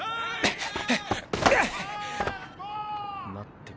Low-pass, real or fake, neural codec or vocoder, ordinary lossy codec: none; real; none; none